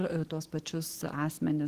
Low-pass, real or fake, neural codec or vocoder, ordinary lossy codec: 14.4 kHz; real; none; Opus, 16 kbps